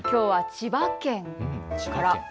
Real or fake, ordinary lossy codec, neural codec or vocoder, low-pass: real; none; none; none